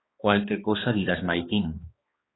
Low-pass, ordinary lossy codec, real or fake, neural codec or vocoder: 7.2 kHz; AAC, 16 kbps; fake; codec, 16 kHz, 2 kbps, X-Codec, HuBERT features, trained on balanced general audio